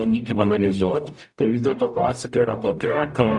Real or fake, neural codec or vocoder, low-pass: fake; codec, 44.1 kHz, 0.9 kbps, DAC; 10.8 kHz